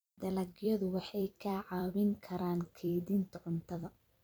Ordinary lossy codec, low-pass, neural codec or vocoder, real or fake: none; none; vocoder, 44.1 kHz, 128 mel bands every 256 samples, BigVGAN v2; fake